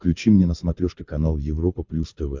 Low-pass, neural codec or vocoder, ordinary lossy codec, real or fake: 7.2 kHz; codec, 24 kHz, 6 kbps, HILCodec; MP3, 64 kbps; fake